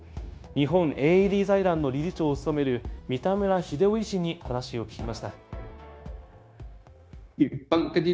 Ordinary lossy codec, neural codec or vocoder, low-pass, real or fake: none; codec, 16 kHz, 0.9 kbps, LongCat-Audio-Codec; none; fake